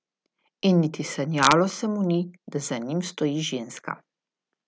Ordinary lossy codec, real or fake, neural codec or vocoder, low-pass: none; real; none; none